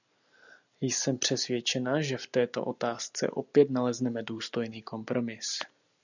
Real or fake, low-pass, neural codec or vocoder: real; 7.2 kHz; none